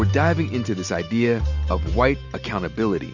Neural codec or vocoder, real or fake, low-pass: none; real; 7.2 kHz